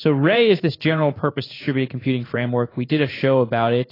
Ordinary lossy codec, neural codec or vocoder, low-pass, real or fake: AAC, 24 kbps; codec, 16 kHz in and 24 kHz out, 1 kbps, XY-Tokenizer; 5.4 kHz; fake